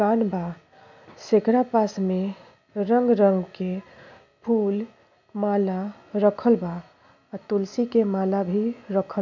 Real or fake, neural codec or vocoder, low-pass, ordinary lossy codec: real; none; 7.2 kHz; MP3, 64 kbps